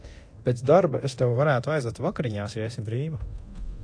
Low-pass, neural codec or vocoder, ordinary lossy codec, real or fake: 9.9 kHz; codec, 24 kHz, 0.9 kbps, DualCodec; AAC, 48 kbps; fake